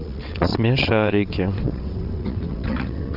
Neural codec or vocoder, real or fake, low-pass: vocoder, 22.05 kHz, 80 mel bands, WaveNeXt; fake; 5.4 kHz